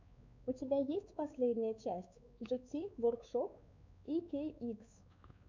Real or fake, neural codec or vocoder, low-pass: fake; codec, 16 kHz, 4 kbps, X-Codec, WavLM features, trained on Multilingual LibriSpeech; 7.2 kHz